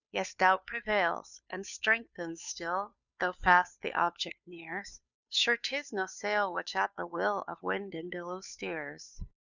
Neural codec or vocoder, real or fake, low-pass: codec, 16 kHz, 2 kbps, FunCodec, trained on Chinese and English, 25 frames a second; fake; 7.2 kHz